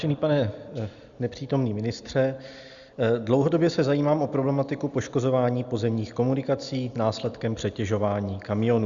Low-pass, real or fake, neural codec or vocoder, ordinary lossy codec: 7.2 kHz; real; none; Opus, 64 kbps